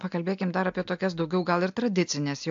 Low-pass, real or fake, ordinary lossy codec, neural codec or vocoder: 7.2 kHz; real; AAC, 64 kbps; none